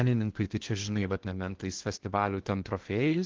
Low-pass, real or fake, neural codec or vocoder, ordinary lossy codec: 7.2 kHz; fake; codec, 16 kHz in and 24 kHz out, 0.8 kbps, FocalCodec, streaming, 65536 codes; Opus, 16 kbps